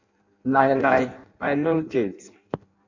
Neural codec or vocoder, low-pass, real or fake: codec, 16 kHz in and 24 kHz out, 0.6 kbps, FireRedTTS-2 codec; 7.2 kHz; fake